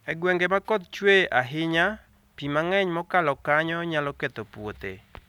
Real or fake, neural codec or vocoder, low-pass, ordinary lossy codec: real; none; 19.8 kHz; none